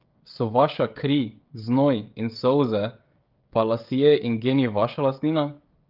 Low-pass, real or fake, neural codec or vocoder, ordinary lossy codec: 5.4 kHz; fake; codec, 16 kHz, 16 kbps, FreqCodec, smaller model; Opus, 32 kbps